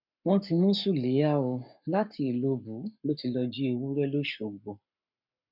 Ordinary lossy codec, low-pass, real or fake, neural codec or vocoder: none; 5.4 kHz; fake; codec, 44.1 kHz, 7.8 kbps, Pupu-Codec